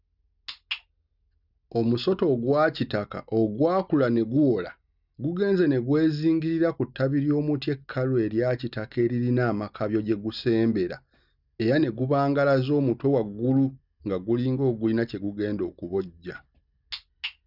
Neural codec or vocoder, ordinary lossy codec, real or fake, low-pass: none; none; real; 5.4 kHz